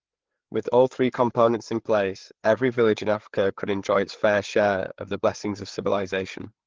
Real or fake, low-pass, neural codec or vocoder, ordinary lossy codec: fake; 7.2 kHz; codec, 16 kHz, 4 kbps, FreqCodec, larger model; Opus, 16 kbps